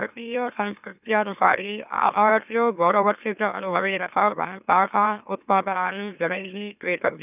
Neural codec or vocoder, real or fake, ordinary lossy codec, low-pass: autoencoder, 44.1 kHz, a latent of 192 numbers a frame, MeloTTS; fake; none; 3.6 kHz